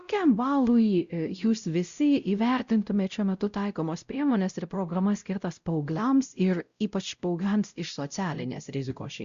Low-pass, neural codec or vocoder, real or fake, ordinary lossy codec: 7.2 kHz; codec, 16 kHz, 0.5 kbps, X-Codec, WavLM features, trained on Multilingual LibriSpeech; fake; Opus, 64 kbps